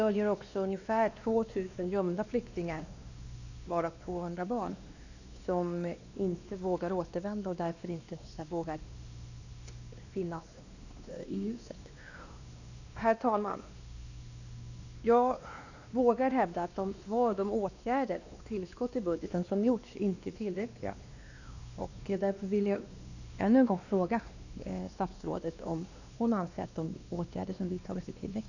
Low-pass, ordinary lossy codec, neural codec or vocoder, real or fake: 7.2 kHz; none; codec, 16 kHz, 2 kbps, X-Codec, WavLM features, trained on Multilingual LibriSpeech; fake